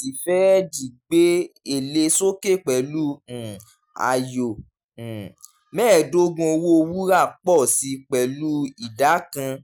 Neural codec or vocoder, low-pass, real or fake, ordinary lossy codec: none; none; real; none